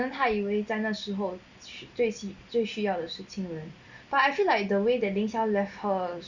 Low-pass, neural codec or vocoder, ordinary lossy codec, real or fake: 7.2 kHz; none; Opus, 64 kbps; real